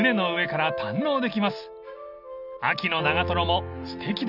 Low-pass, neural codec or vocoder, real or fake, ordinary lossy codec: 5.4 kHz; none; real; none